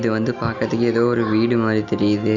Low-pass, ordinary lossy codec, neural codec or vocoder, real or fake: 7.2 kHz; none; none; real